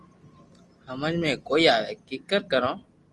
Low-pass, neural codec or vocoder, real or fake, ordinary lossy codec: 10.8 kHz; none; real; Opus, 24 kbps